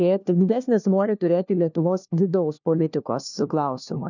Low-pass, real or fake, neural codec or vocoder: 7.2 kHz; fake; codec, 16 kHz, 1 kbps, FunCodec, trained on LibriTTS, 50 frames a second